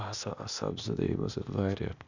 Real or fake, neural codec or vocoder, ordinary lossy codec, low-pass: fake; codec, 16 kHz, 0.8 kbps, ZipCodec; none; 7.2 kHz